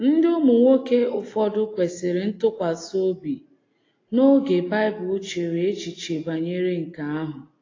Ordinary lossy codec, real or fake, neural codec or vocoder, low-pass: AAC, 32 kbps; real; none; 7.2 kHz